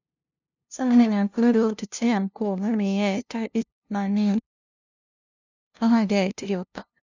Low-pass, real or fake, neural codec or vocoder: 7.2 kHz; fake; codec, 16 kHz, 0.5 kbps, FunCodec, trained on LibriTTS, 25 frames a second